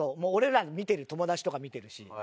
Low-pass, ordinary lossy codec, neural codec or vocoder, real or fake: none; none; none; real